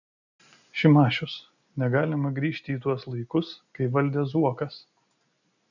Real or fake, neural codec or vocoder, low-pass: real; none; 7.2 kHz